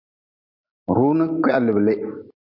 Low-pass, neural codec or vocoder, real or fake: 5.4 kHz; none; real